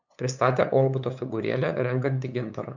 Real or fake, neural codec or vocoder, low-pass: fake; codec, 16 kHz, 8 kbps, FunCodec, trained on LibriTTS, 25 frames a second; 7.2 kHz